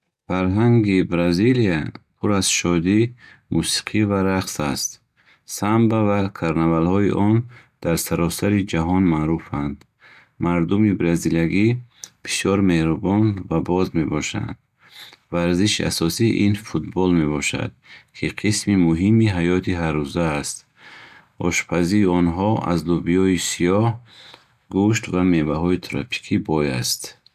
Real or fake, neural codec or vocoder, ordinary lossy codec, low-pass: real; none; none; 14.4 kHz